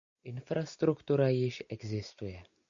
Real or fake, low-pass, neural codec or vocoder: real; 7.2 kHz; none